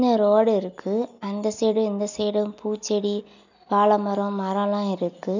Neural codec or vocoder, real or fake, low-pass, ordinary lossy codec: none; real; 7.2 kHz; none